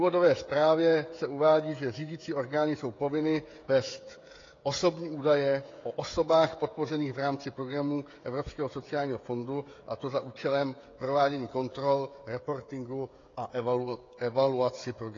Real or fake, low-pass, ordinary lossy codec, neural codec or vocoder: fake; 7.2 kHz; AAC, 32 kbps; codec, 16 kHz, 16 kbps, FreqCodec, smaller model